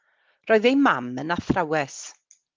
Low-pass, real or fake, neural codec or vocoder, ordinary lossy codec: 7.2 kHz; real; none; Opus, 32 kbps